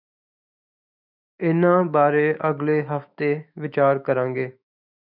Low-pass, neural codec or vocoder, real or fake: 5.4 kHz; autoencoder, 48 kHz, 128 numbers a frame, DAC-VAE, trained on Japanese speech; fake